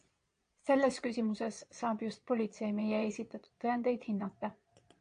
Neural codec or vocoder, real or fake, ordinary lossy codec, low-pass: vocoder, 22.05 kHz, 80 mel bands, Vocos; fake; AAC, 48 kbps; 9.9 kHz